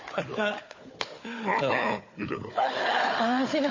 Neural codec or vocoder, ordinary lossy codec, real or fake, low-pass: codec, 16 kHz, 4 kbps, FunCodec, trained on Chinese and English, 50 frames a second; MP3, 32 kbps; fake; 7.2 kHz